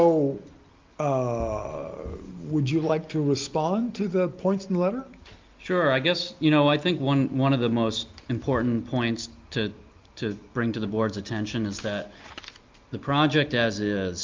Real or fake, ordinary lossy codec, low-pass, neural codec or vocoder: real; Opus, 24 kbps; 7.2 kHz; none